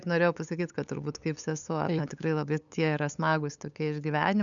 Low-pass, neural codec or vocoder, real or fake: 7.2 kHz; codec, 16 kHz, 8 kbps, FunCodec, trained on LibriTTS, 25 frames a second; fake